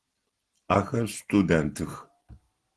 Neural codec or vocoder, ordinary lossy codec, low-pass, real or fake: none; Opus, 16 kbps; 10.8 kHz; real